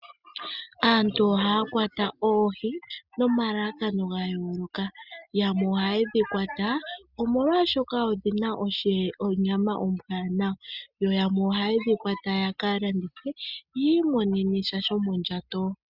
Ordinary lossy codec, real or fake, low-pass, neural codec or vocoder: Opus, 64 kbps; real; 5.4 kHz; none